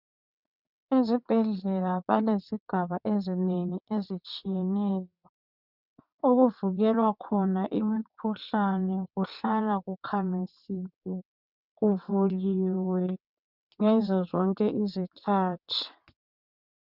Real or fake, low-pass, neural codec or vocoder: fake; 5.4 kHz; vocoder, 22.05 kHz, 80 mel bands, WaveNeXt